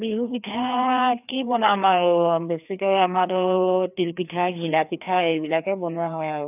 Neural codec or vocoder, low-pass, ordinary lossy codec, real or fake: codec, 16 kHz, 2 kbps, FreqCodec, larger model; 3.6 kHz; none; fake